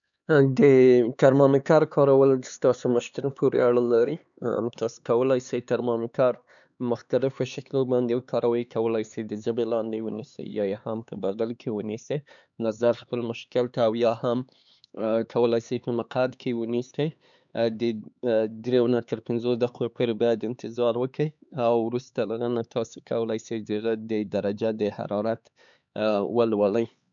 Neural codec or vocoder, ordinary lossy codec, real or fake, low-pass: codec, 16 kHz, 4 kbps, X-Codec, HuBERT features, trained on LibriSpeech; none; fake; 7.2 kHz